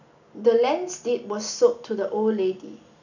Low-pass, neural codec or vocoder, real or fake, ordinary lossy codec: 7.2 kHz; none; real; none